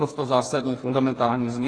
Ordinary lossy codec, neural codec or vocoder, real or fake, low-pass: AAC, 32 kbps; codec, 44.1 kHz, 2.6 kbps, DAC; fake; 9.9 kHz